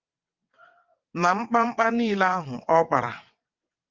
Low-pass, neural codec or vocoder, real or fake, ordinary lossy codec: 7.2 kHz; vocoder, 22.05 kHz, 80 mel bands, WaveNeXt; fake; Opus, 24 kbps